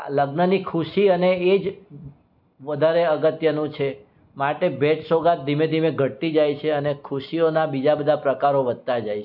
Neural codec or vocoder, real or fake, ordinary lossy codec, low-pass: none; real; MP3, 48 kbps; 5.4 kHz